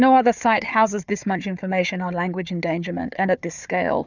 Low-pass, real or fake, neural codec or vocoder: 7.2 kHz; fake; codec, 16 kHz, 4 kbps, FunCodec, trained on Chinese and English, 50 frames a second